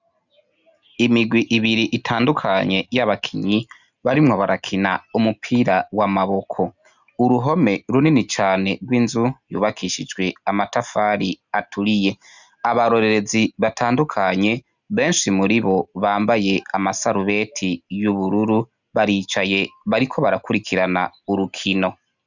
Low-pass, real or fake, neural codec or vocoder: 7.2 kHz; real; none